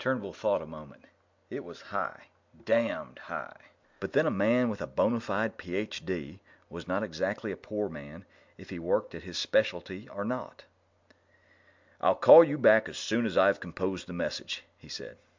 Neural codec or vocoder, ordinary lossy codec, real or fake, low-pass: none; MP3, 64 kbps; real; 7.2 kHz